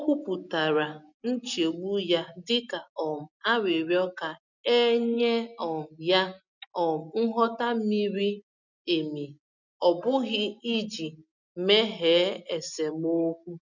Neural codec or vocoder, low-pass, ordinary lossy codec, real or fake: none; 7.2 kHz; none; real